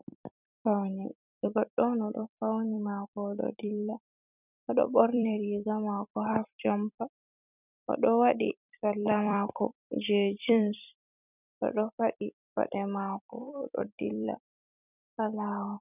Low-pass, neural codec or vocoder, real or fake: 3.6 kHz; vocoder, 44.1 kHz, 128 mel bands every 256 samples, BigVGAN v2; fake